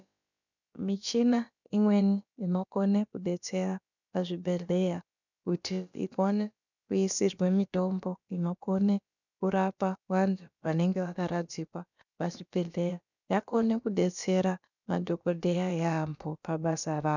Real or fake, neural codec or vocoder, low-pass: fake; codec, 16 kHz, about 1 kbps, DyCAST, with the encoder's durations; 7.2 kHz